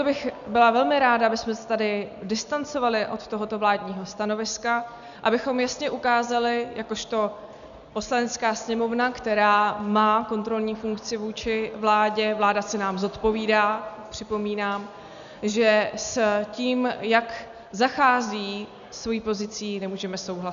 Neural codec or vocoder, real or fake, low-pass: none; real; 7.2 kHz